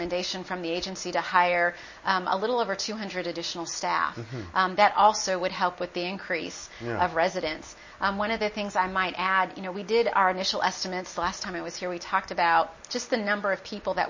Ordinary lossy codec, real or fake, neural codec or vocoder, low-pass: MP3, 32 kbps; real; none; 7.2 kHz